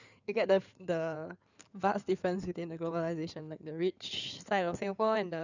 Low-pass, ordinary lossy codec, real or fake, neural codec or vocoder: 7.2 kHz; none; fake; codec, 16 kHz in and 24 kHz out, 2.2 kbps, FireRedTTS-2 codec